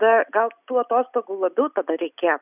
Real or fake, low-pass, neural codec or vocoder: real; 3.6 kHz; none